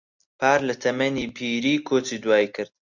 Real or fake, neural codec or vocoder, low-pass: real; none; 7.2 kHz